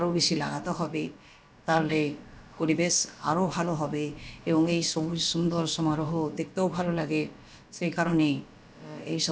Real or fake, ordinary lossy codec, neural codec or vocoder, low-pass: fake; none; codec, 16 kHz, about 1 kbps, DyCAST, with the encoder's durations; none